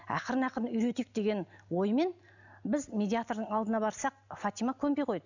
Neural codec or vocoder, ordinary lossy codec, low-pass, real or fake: none; none; 7.2 kHz; real